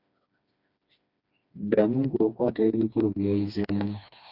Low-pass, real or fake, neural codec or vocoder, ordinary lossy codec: 5.4 kHz; fake; codec, 16 kHz, 2 kbps, FreqCodec, smaller model; Opus, 64 kbps